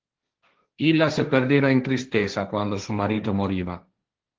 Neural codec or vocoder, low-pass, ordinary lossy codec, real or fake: codec, 16 kHz, 1.1 kbps, Voila-Tokenizer; 7.2 kHz; Opus, 16 kbps; fake